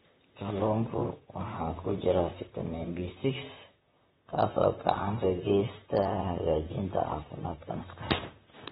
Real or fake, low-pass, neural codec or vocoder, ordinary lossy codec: fake; 19.8 kHz; vocoder, 44.1 kHz, 128 mel bands, Pupu-Vocoder; AAC, 16 kbps